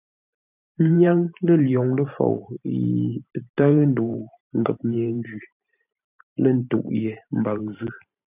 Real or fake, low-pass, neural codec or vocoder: fake; 3.6 kHz; vocoder, 44.1 kHz, 128 mel bands every 512 samples, BigVGAN v2